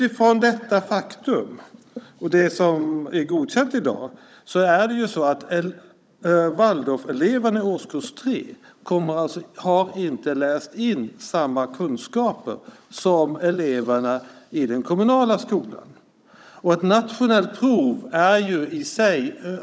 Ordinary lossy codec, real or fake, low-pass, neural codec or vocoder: none; fake; none; codec, 16 kHz, 16 kbps, FunCodec, trained on Chinese and English, 50 frames a second